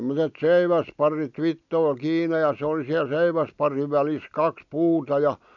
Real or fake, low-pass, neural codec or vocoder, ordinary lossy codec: real; 7.2 kHz; none; none